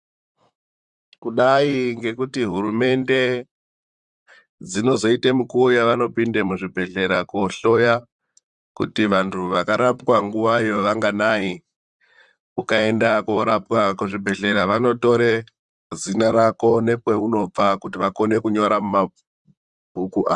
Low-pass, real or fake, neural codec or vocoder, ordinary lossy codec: 10.8 kHz; fake; vocoder, 44.1 kHz, 128 mel bands, Pupu-Vocoder; Opus, 64 kbps